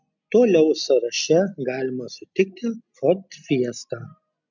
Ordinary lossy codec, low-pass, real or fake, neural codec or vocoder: MP3, 64 kbps; 7.2 kHz; real; none